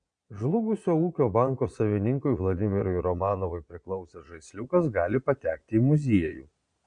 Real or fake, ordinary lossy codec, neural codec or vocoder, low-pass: fake; MP3, 64 kbps; vocoder, 22.05 kHz, 80 mel bands, Vocos; 9.9 kHz